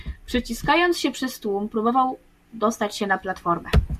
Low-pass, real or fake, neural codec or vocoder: 14.4 kHz; real; none